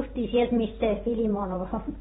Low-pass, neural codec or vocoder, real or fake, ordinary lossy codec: 19.8 kHz; vocoder, 44.1 kHz, 128 mel bands every 512 samples, BigVGAN v2; fake; AAC, 16 kbps